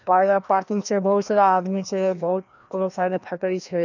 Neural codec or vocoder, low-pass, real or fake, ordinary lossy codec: codec, 16 kHz, 1 kbps, FreqCodec, larger model; 7.2 kHz; fake; none